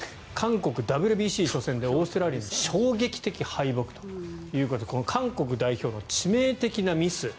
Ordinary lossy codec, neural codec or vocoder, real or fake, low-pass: none; none; real; none